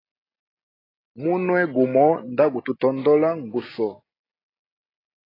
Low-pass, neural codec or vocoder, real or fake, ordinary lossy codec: 5.4 kHz; none; real; AAC, 24 kbps